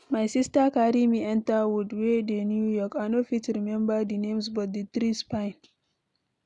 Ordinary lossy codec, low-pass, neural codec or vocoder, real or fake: none; 10.8 kHz; none; real